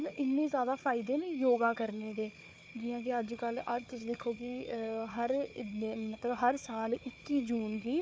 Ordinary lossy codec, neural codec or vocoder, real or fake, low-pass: none; codec, 16 kHz, 4 kbps, FunCodec, trained on Chinese and English, 50 frames a second; fake; none